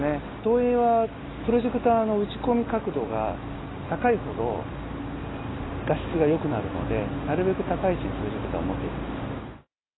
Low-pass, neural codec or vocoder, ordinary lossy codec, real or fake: 7.2 kHz; none; AAC, 16 kbps; real